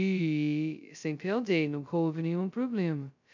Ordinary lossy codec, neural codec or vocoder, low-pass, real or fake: none; codec, 16 kHz, 0.2 kbps, FocalCodec; 7.2 kHz; fake